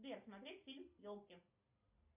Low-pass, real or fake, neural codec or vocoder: 3.6 kHz; real; none